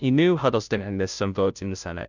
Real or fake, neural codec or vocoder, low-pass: fake; codec, 16 kHz, 0.5 kbps, FunCodec, trained on Chinese and English, 25 frames a second; 7.2 kHz